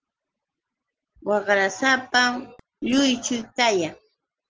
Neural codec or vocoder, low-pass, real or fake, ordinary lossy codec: none; 7.2 kHz; real; Opus, 16 kbps